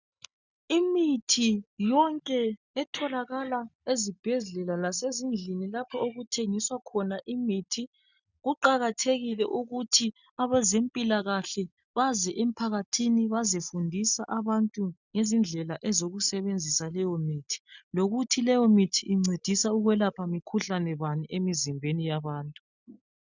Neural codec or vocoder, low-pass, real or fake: none; 7.2 kHz; real